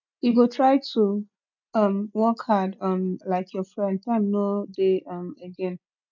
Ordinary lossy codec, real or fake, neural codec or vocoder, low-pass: none; real; none; 7.2 kHz